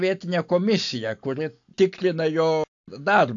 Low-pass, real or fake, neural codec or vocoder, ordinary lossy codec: 7.2 kHz; real; none; AAC, 64 kbps